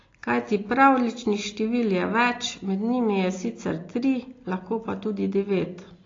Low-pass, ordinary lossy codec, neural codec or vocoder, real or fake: 7.2 kHz; AAC, 32 kbps; none; real